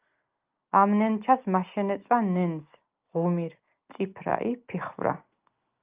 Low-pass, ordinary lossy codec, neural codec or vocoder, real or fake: 3.6 kHz; Opus, 24 kbps; none; real